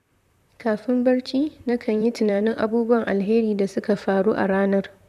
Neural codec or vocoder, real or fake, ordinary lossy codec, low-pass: vocoder, 44.1 kHz, 128 mel bands, Pupu-Vocoder; fake; none; 14.4 kHz